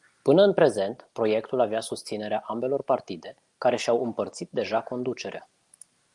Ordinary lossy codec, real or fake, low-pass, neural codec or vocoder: Opus, 32 kbps; real; 10.8 kHz; none